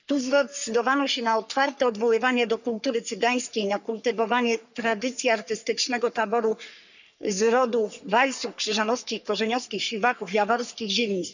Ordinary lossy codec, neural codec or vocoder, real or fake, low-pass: none; codec, 44.1 kHz, 3.4 kbps, Pupu-Codec; fake; 7.2 kHz